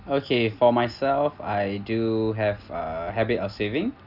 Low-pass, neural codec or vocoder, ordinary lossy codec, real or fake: 5.4 kHz; none; none; real